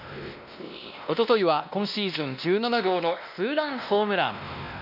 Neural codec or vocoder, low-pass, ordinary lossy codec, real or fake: codec, 16 kHz, 1 kbps, X-Codec, WavLM features, trained on Multilingual LibriSpeech; 5.4 kHz; none; fake